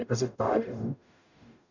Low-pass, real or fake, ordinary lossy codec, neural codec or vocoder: 7.2 kHz; fake; none; codec, 44.1 kHz, 0.9 kbps, DAC